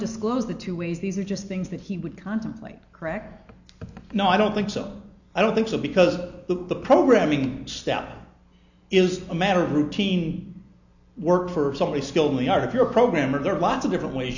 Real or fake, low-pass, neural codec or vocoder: real; 7.2 kHz; none